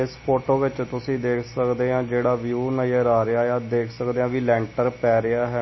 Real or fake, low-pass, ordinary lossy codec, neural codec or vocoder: real; 7.2 kHz; MP3, 24 kbps; none